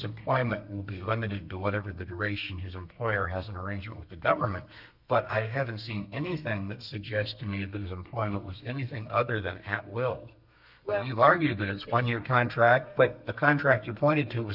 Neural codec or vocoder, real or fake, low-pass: codec, 32 kHz, 1.9 kbps, SNAC; fake; 5.4 kHz